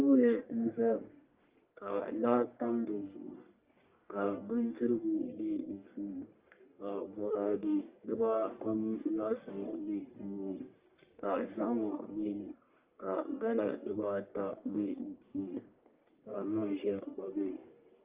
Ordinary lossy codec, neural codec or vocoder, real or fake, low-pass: Opus, 32 kbps; codec, 44.1 kHz, 1.7 kbps, Pupu-Codec; fake; 3.6 kHz